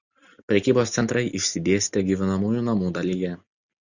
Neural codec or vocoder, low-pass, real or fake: none; 7.2 kHz; real